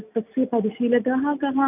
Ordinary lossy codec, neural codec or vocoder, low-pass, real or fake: none; none; 3.6 kHz; real